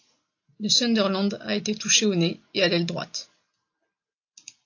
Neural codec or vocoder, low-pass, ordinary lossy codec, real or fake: none; 7.2 kHz; AAC, 48 kbps; real